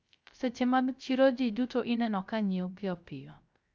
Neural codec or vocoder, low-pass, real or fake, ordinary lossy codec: codec, 16 kHz, 0.3 kbps, FocalCodec; none; fake; none